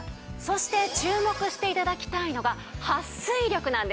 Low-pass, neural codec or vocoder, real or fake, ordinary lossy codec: none; none; real; none